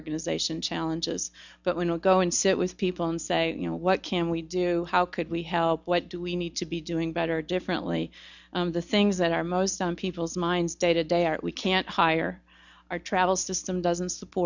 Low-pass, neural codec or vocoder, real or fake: 7.2 kHz; none; real